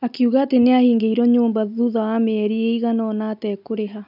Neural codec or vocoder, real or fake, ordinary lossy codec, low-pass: none; real; none; 5.4 kHz